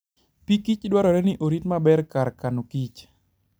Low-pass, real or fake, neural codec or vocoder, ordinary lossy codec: none; real; none; none